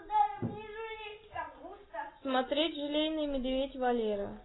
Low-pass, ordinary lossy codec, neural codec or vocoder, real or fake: 7.2 kHz; AAC, 16 kbps; none; real